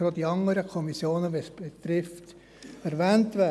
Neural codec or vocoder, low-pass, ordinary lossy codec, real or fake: none; none; none; real